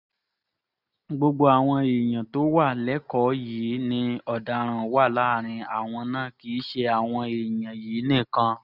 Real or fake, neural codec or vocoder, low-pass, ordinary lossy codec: real; none; 5.4 kHz; none